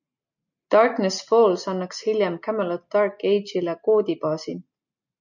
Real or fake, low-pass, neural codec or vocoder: real; 7.2 kHz; none